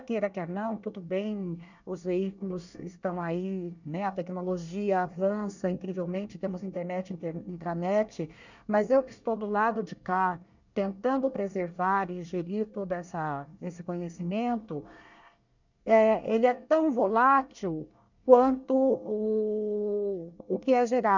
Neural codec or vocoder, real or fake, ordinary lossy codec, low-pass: codec, 24 kHz, 1 kbps, SNAC; fake; none; 7.2 kHz